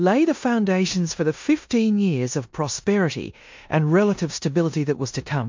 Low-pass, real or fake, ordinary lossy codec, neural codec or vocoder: 7.2 kHz; fake; MP3, 48 kbps; codec, 16 kHz in and 24 kHz out, 0.9 kbps, LongCat-Audio-Codec, four codebook decoder